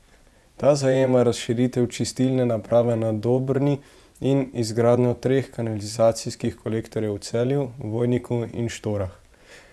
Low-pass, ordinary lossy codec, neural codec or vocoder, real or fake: none; none; vocoder, 24 kHz, 100 mel bands, Vocos; fake